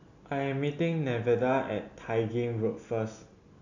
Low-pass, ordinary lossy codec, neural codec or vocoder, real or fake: 7.2 kHz; none; none; real